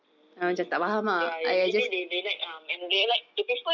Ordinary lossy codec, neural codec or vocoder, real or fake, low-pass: none; none; real; 7.2 kHz